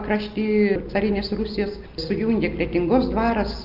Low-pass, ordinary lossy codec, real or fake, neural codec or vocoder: 5.4 kHz; Opus, 24 kbps; real; none